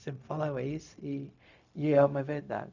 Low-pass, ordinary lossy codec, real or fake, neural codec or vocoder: 7.2 kHz; none; fake; codec, 16 kHz, 0.4 kbps, LongCat-Audio-Codec